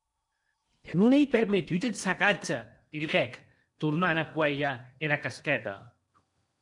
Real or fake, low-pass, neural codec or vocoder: fake; 10.8 kHz; codec, 16 kHz in and 24 kHz out, 0.8 kbps, FocalCodec, streaming, 65536 codes